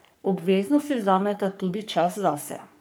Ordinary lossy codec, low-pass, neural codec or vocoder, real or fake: none; none; codec, 44.1 kHz, 3.4 kbps, Pupu-Codec; fake